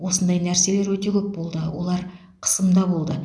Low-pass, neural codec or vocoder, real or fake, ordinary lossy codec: none; none; real; none